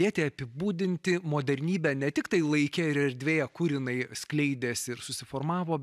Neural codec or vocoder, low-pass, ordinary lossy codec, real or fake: none; 14.4 kHz; AAC, 96 kbps; real